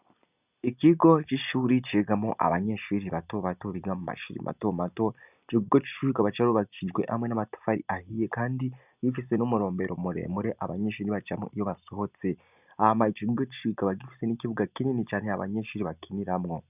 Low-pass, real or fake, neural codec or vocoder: 3.6 kHz; real; none